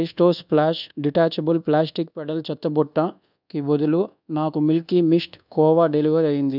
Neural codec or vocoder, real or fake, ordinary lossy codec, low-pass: codec, 24 kHz, 1.2 kbps, DualCodec; fake; none; 5.4 kHz